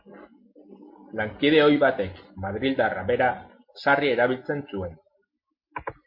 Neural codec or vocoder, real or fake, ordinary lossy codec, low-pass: none; real; MP3, 32 kbps; 5.4 kHz